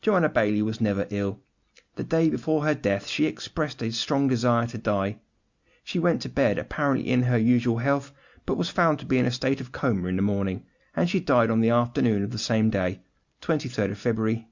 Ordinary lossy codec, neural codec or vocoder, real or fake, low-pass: Opus, 64 kbps; none; real; 7.2 kHz